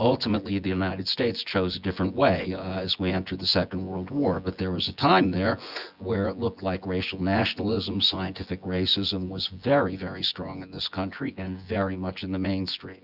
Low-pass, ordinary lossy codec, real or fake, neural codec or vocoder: 5.4 kHz; Opus, 64 kbps; fake; vocoder, 24 kHz, 100 mel bands, Vocos